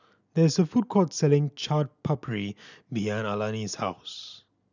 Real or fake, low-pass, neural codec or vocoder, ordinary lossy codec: real; 7.2 kHz; none; none